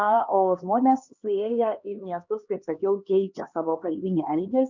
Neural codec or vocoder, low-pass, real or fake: codec, 16 kHz, 2 kbps, X-Codec, HuBERT features, trained on LibriSpeech; 7.2 kHz; fake